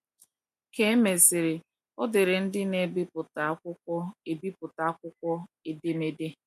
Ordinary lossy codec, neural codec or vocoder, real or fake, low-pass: MP3, 64 kbps; none; real; 14.4 kHz